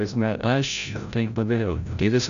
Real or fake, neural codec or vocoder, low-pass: fake; codec, 16 kHz, 0.5 kbps, FreqCodec, larger model; 7.2 kHz